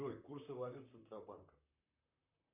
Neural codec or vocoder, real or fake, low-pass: vocoder, 44.1 kHz, 128 mel bands, Pupu-Vocoder; fake; 3.6 kHz